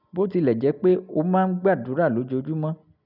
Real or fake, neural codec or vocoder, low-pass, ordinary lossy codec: real; none; 5.4 kHz; none